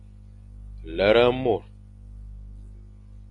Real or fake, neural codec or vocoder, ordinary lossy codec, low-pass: real; none; AAC, 64 kbps; 10.8 kHz